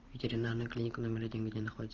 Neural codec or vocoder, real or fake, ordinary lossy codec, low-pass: vocoder, 44.1 kHz, 128 mel bands every 512 samples, BigVGAN v2; fake; Opus, 16 kbps; 7.2 kHz